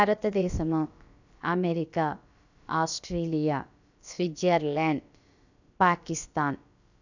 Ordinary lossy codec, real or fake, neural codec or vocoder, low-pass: none; fake; codec, 16 kHz, about 1 kbps, DyCAST, with the encoder's durations; 7.2 kHz